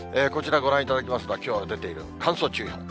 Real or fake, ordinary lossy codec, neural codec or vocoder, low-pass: real; none; none; none